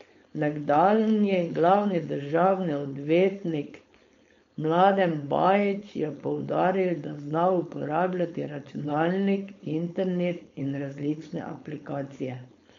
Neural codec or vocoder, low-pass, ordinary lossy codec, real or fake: codec, 16 kHz, 4.8 kbps, FACodec; 7.2 kHz; MP3, 48 kbps; fake